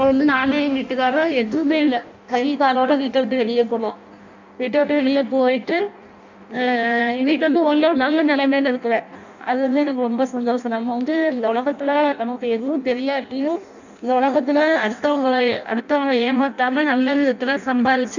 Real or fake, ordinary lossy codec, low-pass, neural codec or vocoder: fake; none; 7.2 kHz; codec, 16 kHz in and 24 kHz out, 0.6 kbps, FireRedTTS-2 codec